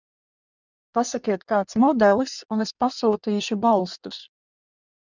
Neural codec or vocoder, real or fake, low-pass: codec, 16 kHz in and 24 kHz out, 1.1 kbps, FireRedTTS-2 codec; fake; 7.2 kHz